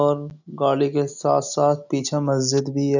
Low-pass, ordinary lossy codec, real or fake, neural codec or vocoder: 7.2 kHz; none; real; none